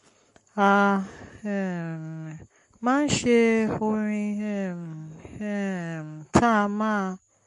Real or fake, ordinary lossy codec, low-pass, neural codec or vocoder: fake; MP3, 48 kbps; 14.4 kHz; codec, 44.1 kHz, 7.8 kbps, Pupu-Codec